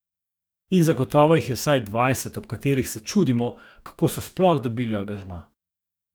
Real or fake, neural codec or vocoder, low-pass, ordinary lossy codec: fake; codec, 44.1 kHz, 2.6 kbps, DAC; none; none